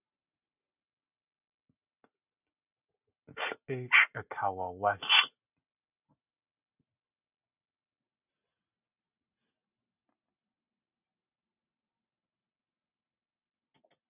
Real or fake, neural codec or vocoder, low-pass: real; none; 3.6 kHz